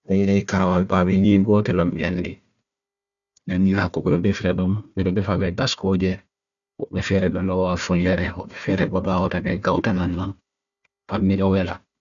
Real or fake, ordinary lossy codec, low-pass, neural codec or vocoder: fake; none; 7.2 kHz; codec, 16 kHz, 1 kbps, FunCodec, trained on Chinese and English, 50 frames a second